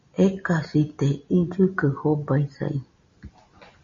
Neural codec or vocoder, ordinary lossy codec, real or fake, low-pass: none; MP3, 32 kbps; real; 7.2 kHz